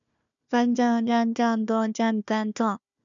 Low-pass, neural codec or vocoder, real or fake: 7.2 kHz; codec, 16 kHz, 1 kbps, FunCodec, trained on Chinese and English, 50 frames a second; fake